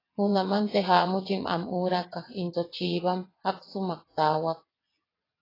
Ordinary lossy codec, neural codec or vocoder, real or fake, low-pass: AAC, 24 kbps; vocoder, 22.05 kHz, 80 mel bands, WaveNeXt; fake; 5.4 kHz